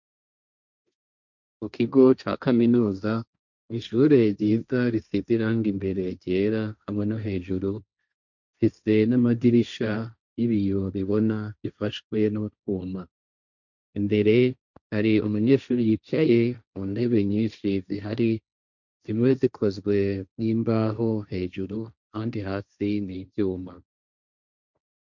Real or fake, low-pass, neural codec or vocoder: fake; 7.2 kHz; codec, 16 kHz, 1.1 kbps, Voila-Tokenizer